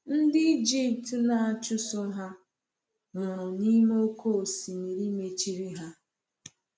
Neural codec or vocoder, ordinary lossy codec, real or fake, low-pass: none; none; real; none